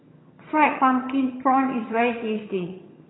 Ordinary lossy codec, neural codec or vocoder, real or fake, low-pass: AAC, 16 kbps; vocoder, 22.05 kHz, 80 mel bands, HiFi-GAN; fake; 7.2 kHz